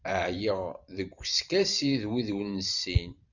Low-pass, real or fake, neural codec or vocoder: 7.2 kHz; fake; vocoder, 44.1 kHz, 128 mel bands every 512 samples, BigVGAN v2